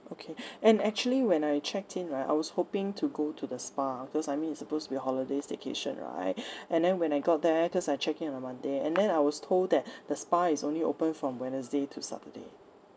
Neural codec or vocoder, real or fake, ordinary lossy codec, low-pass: none; real; none; none